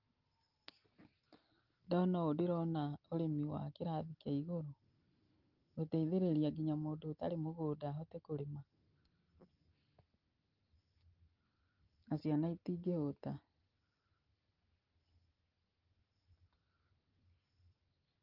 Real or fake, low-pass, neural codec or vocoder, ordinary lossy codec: real; 5.4 kHz; none; Opus, 32 kbps